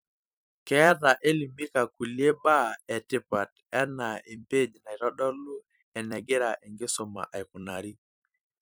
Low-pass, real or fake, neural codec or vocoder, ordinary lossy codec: none; fake; vocoder, 44.1 kHz, 128 mel bands every 256 samples, BigVGAN v2; none